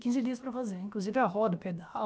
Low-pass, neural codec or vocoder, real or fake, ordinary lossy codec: none; codec, 16 kHz, about 1 kbps, DyCAST, with the encoder's durations; fake; none